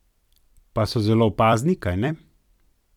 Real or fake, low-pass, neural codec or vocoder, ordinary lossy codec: fake; 19.8 kHz; vocoder, 44.1 kHz, 128 mel bands every 256 samples, BigVGAN v2; none